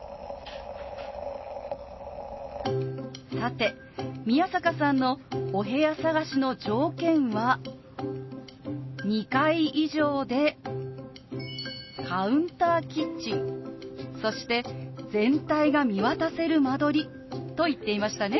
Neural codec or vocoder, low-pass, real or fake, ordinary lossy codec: none; 7.2 kHz; real; MP3, 24 kbps